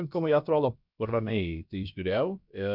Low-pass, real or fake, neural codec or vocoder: 5.4 kHz; fake; codec, 16 kHz, about 1 kbps, DyCAST, with the encoder's durations